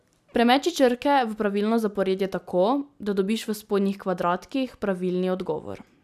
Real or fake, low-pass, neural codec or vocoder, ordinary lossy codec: real; 14.4 kHz; none; none